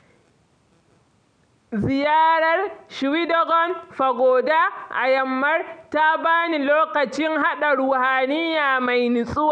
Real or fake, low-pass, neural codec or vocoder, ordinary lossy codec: real; 9.9 kHz; none; none